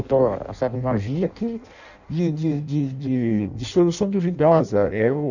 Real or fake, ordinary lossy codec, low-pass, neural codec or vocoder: fake; none; 7.2 kHz; codec, 16 kHz in and 24 kHz out, 0.6 kbps, FireRedTTS-2 codec